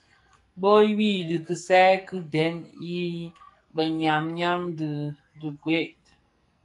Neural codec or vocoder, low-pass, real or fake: codec, 44.1 kHz, 2.6 kbps, SNAC; 10.8 kHz; fake